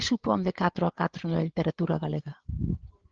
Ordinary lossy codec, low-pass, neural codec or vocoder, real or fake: Opus, 16 kbps; 7.2 kHz; codec, 16 kHz, 8 kbps, FreqCodec, larger model; fake